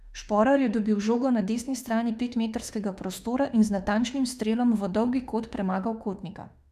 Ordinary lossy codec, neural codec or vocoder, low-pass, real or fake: none; autoencoder, 48 kHz, 32 numbers a frame, DAC-VAE, trained on Japanese speech; 14.4 kHz; fake